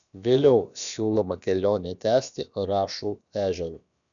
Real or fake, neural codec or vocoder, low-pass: fake; codec, 16 kHz, about 1 kbps, DyCAST, with the encoder's durations; 7.2 kHz